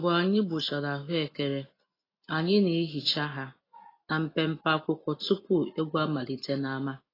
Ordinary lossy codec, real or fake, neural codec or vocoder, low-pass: AAC, 24 kbps; real; none; 5.4 kHz